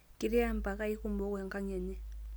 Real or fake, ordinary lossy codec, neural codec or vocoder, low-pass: real; none; none; none